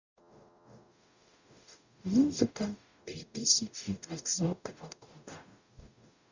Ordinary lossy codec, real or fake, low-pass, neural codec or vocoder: Opus, 64 kbps; fake; 7.2 kHz; codec, 44.1 kHz, 0.9 kbps, DAC